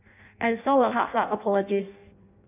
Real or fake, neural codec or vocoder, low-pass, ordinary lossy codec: fake; codec, 16 kHz in and 24 kHz out, 0.6 kbps, FireRedTTS-2 codec; 3.6 kHz; none